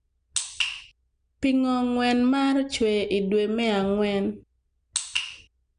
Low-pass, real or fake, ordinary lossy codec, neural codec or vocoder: 9.9 kHz; real; none; none